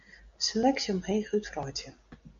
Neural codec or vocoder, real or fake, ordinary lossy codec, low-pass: none; real; MP3, 64 kbps; 7.2 kHz